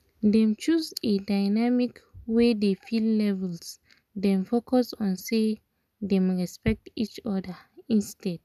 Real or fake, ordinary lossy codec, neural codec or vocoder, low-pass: real; none; none; 14.4 kHz